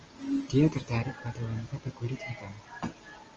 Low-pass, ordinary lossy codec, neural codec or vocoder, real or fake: 7.2 kHz; Opus, 24 kbps; none; real